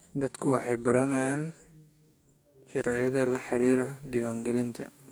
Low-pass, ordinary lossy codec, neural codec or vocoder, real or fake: none; none; codec, 44.1 kHz, 2.6 kbps, DAC; fake